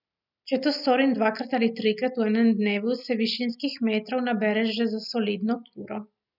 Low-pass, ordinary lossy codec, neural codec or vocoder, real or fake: 5.4 kHz; none; none; real